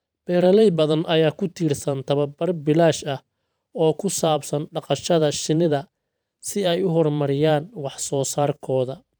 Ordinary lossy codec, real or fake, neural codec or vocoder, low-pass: none; fake; vocoder, 44.1 kHz, 128 mel bands every 512 samples, BigVGAN v2; none